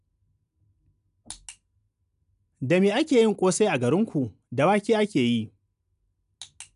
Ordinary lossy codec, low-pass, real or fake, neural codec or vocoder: none; 10.8 kHz; real; none